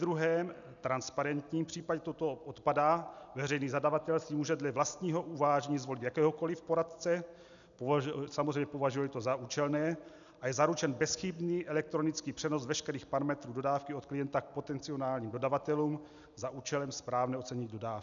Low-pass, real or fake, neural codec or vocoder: 7.2 kHz; real; none